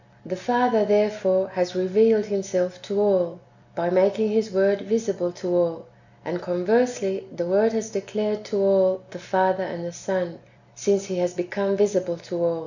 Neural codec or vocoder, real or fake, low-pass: none; real; 7.2 kHz